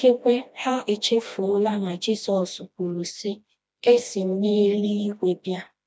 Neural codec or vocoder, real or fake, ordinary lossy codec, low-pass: codec, 16 kHz, 1 kbps, FreqCodec, smaller model; fake; none; none